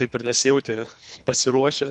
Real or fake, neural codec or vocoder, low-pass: fake; codec, 24 kHz, 3 kbps, HILCodec; 10.8 kHz